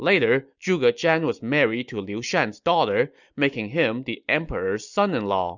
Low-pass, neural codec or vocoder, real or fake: 7.2 kHz; none; real